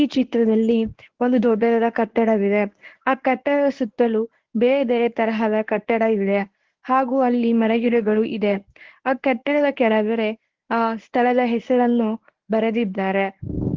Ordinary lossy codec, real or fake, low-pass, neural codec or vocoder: Opus, 16 kbps; fake; 7.2 kHz; codec, 24 kHz, 0.9 kbps, WavTokenizer, medium speech release version 1